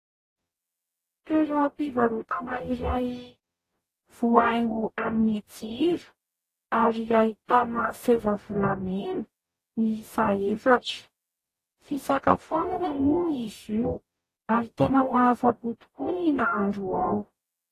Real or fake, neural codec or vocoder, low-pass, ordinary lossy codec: fake; codec, 44.1 kHz, 0.9 kbps, DAC; 14.4 kHz; AAC, 48 kbps